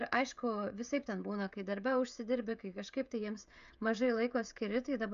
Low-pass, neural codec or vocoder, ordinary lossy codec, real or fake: 7.2 kHz; codec, 16 kHz, 16 kbps, FreqCodec, smaller model; AAC, 96 kbps; fake